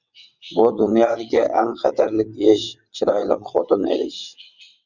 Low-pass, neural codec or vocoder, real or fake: 7.2 kHz; vocoder, 22.05 kHz, 80 mel bands, WaveNeXt; fake